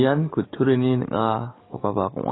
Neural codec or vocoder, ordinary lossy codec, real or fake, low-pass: codec, 44.1 kHz, 7.8 kbps, DAC; AAC, 16 kbps; fake; 7.2 kHz